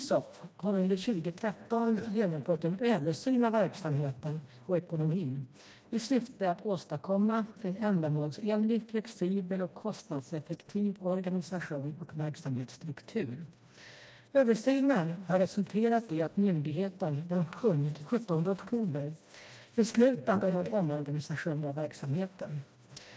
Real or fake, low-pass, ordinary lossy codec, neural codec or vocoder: fake; none; none; codec, 16 kHz, 1 kbps, FreqCodec, smaller model